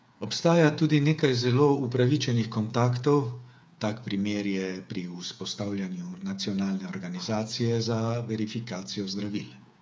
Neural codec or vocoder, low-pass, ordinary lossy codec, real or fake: codec, 16 kHz, 8 kbps, FreqCodec, smaller model; none; none; fake